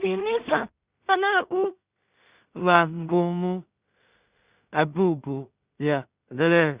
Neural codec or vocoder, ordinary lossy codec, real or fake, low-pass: codec, 16 kHz in and 24 kHz out, 0.4 kbps, LongCat-Audio-Codec, two codebook decoder; Opus, 64 kbps; fake; 3.6 kHz